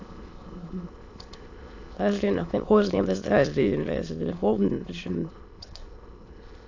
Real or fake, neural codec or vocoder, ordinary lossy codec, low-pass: fake; autoencoder, 22.05 kHz, a latent of 192 numbers a frame, VITS, trained on many speakers; AAC, 48 kbps; 7.2 kHz